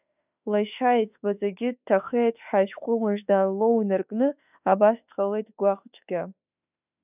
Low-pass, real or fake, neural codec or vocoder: 3.6 kHz; fake; autoencoder, 48 kHz, 32 numbers a frame, DAC-VAE, trained on Japanese speech